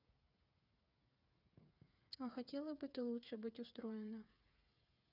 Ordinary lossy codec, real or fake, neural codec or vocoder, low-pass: none; fake; codec, 16 kHz, 8 kbps, FreqCodec, smaller model; 5.4 kHz